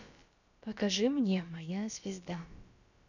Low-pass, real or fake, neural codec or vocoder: 7.2 kHz; fake; codec, 16 kHz, about 1 kbps, DyCAST, with the encoder's durations